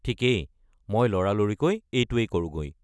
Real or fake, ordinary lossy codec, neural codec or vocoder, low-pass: real; none; none; none